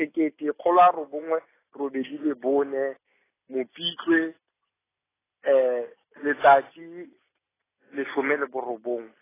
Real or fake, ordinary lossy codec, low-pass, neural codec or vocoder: real; AAC, 16 kbps; 3.6 kHz; none